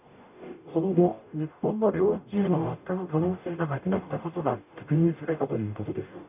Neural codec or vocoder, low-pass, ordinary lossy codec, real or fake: codec, 44.1 kHz, 0.9 kbps, DAC; 3.6 kHz; none; fake